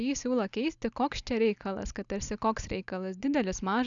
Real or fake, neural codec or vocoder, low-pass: real; none; 7.2 kHz